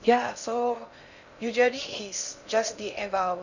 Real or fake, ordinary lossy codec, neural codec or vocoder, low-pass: fake; none; codec, 16 kHz in and 24 kHz out, 0.6 kbps, FocalCodec, streaming, 4096 codes; 7.2 kHz